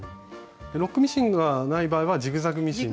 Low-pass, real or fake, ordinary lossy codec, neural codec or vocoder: none; real; none; none